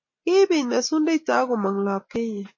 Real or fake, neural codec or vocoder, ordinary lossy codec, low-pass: real; none; MP3, 32 kbps; 7.2 kHz